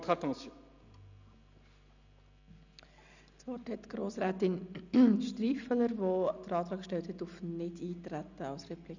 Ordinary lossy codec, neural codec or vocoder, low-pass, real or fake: none; none; 7.2 kHz; real